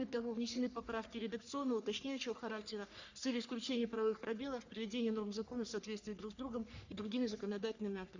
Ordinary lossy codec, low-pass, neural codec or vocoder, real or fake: Opus, 64 kbps; 7.2 kHz; codec, 44.1 kHz, 3.4 kbps, Pupu-Codec; fake